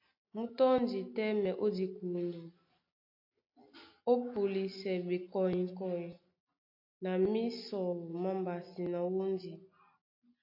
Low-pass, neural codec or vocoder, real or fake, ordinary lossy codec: 5.4 kHz; none; real; AAC, 48 kbps